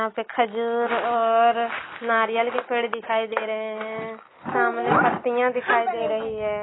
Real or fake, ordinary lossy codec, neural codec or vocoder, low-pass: real; AAC, 16 kbps; none; 7.2 kHz